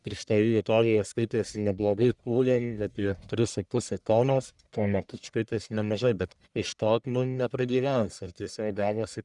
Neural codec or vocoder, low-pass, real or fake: codec, 44.1 kHz, 1.7 kbps, Pupu-Codec; 10.8 kHz; fake